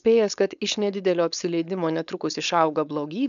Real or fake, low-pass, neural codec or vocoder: fake; 7.2 kHz; codec, 16 kHz, 4.8 kbps, FACodec